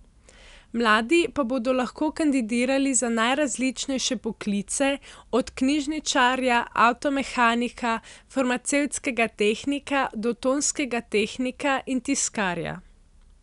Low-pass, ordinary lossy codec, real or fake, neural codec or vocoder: 10.8 kHz; none; real; none